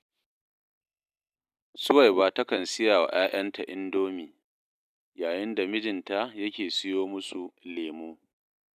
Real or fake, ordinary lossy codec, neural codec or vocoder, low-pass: real; none; none; 14.4 kHz